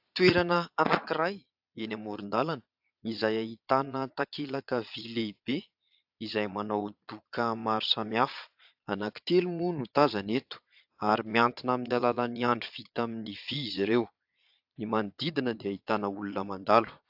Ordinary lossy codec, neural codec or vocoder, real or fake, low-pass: AAC, 48 kbps; vocoder, 22.05 kHz, 80 mel bands, Vocos; fake; 5.4 kHz